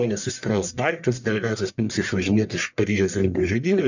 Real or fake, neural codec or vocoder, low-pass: fake; codec, 44.1 kHz, 1.7 kbps, Pupu-Codec; 7.2 kHz